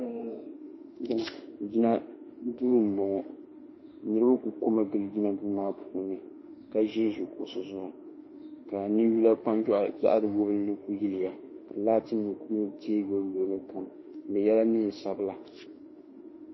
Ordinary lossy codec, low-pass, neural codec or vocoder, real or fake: MP3, 24 kbps; 7.2 kHz; autoencoder, 48 kHz, 32 numbers a frame, DAC-VAE, trained on Japanese speech; fake